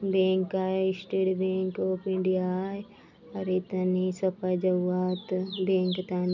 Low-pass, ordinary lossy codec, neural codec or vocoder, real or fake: 7.2 kHz; none; none; real